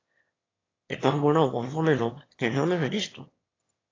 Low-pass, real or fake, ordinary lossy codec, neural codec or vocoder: 7.2 kHz; fake; AAC, 32 kbps; autoencoder, 22.05 kHz, a latent of 192 numbers a frame, VITS, trained on one speaker